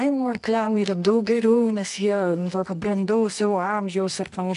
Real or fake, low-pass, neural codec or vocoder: fake; 10.8 kHz; codec, 24 kHz, 0.9 kbps, WavTokenizer, medium music audio release